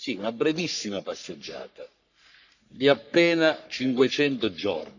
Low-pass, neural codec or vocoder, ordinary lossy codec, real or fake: 7.2 kHz; codec, 44.1 kHz, 3.4 kbps, Pupu-Codec; none; fake